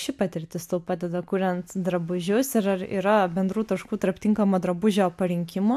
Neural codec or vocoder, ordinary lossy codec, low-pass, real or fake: none; AAC, 96 kbps; 14.4 kHz; real